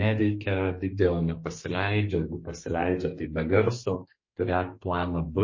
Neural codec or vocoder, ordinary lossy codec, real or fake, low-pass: codec, 32 kHz, 1.9 kbps, SNAC; MP3, 32 kbps; fake; 7.2 kHz